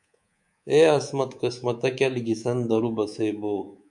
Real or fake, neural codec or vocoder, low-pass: fake; codec, 24 kHz, 3.1 kbps, DualCodec; 10.8 kHz